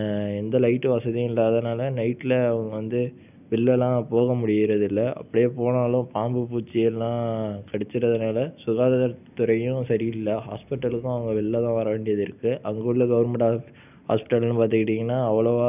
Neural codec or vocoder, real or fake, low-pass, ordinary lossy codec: none; real; 3.6 kHz; none